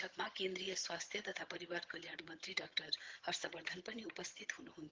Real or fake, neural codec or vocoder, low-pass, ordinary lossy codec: fake; vocoder, 22.05 kHz, 80 mel bands, HiFi-GAN; 7.2 kHz; Opus, 16 kbps